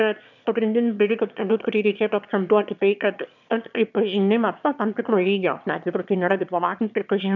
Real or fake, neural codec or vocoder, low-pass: fake; autoencoder, 22.05 kHz, a latent of 192 numbers a frame, VITS, trained on one speaker; 7.2 kHz